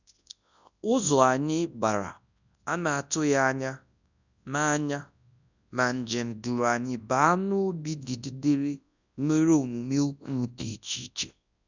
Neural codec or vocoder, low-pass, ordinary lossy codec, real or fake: codec, 24 kHz, 0.9 kbps, WavTokenizer, large speech release; 7.2 kHz; none; fake